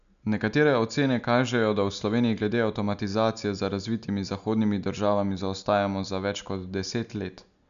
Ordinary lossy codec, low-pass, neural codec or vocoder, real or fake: none; 7.2 kHz; none; real